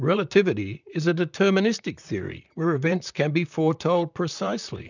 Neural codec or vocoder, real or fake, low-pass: vocoder, 44.1 kHz, 128 mel bands, Pupu-Vocoder; fake; 7.2 kHz